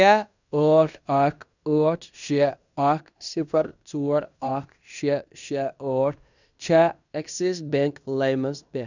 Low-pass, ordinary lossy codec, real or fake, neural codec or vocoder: 7.2 kHz; none; fake; codec, 16 kHz in and 24 kHz out, 0.9 kbps, LongCat-Audio-Codec, fine tuned four codebook decoder